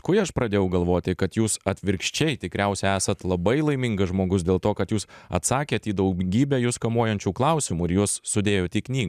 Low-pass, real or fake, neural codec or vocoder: 14.4 kHz; real; none